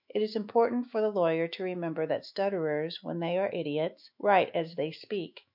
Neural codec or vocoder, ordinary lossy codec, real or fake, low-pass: autoencoder, 48 kHz, 128 numbers a frame, DAC-VAE, trained on Japanese speech; MP3, 48 kbps; fake; 5.4 kHz